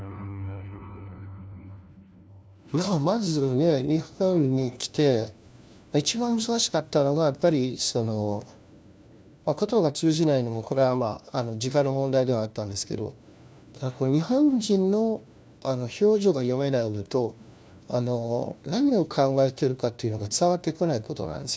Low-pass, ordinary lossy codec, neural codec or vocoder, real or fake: none; none; codec, 16 kHz, 1 kbps, FunCodec, trained on LibriTTS, 50 frames a second; fake